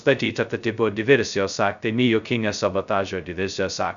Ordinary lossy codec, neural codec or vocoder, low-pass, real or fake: MP3, 96 kbps; codec, 16 kHz, 0.2 kbps, FocalCodec; 7.2 kHz; fake